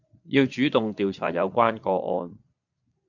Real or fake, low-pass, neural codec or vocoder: fake; 7.2 kHz; vocoder, 22.05 kHz, 80 mel bands, Vocos